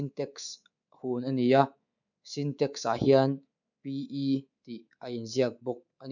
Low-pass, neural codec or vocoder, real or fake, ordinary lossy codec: 7.2 kHz; codec, 24 kHz, 3.1 kbps, DualCodec; fake; none